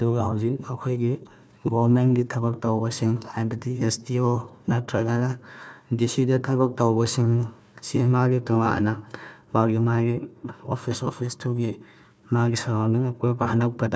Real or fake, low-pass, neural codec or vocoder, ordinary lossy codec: fake; none; codec, 16 kHz, 1 kbps, FunCodec, trained on Chinese and English, 50 frames a second; none